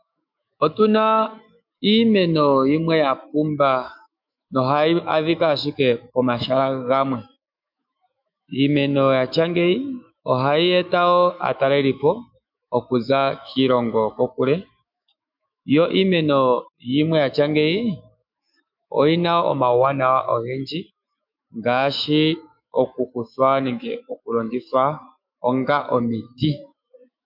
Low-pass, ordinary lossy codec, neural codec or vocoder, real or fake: 5.4 kHz; MP3, 48 kbps; autoencoder, 48 kHz, 128 numbers a frame, DAC-VAE, trained on Japanese speech; fake